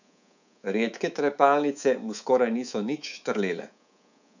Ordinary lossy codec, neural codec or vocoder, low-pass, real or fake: none; codec, 24 kHz, 3.1 kbps, DualCodec; 7.2 kHz; fake